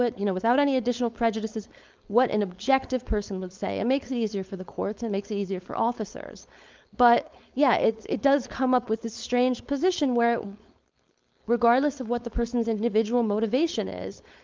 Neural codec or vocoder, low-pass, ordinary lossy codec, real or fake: codec, 16 kHz, 4.8 kbps, FACodec; 7.2 kHz; Opus, 32 kbps; fake